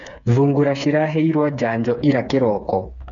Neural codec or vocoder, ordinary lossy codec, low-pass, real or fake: codec, 16 kHz, 4 kbps, FreqCodec, smaller model; none; 7.2 kHz; fake